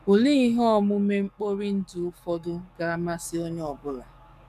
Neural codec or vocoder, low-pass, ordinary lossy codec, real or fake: codec, 44.1 kHz, 7.8 kbps, DAC; 14.4 kHz; none; fake